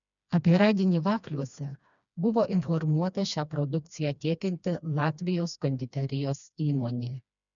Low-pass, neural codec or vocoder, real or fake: 7.2 kHz; codec, 16 kHz, 2 kbps, FreqCodec, smaller model; fake